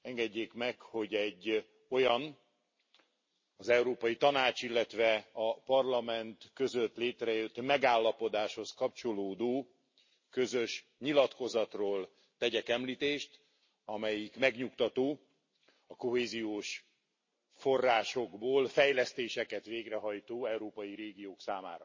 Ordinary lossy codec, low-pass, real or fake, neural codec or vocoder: MP3, 32 kbps; 7.2 kHz; real; none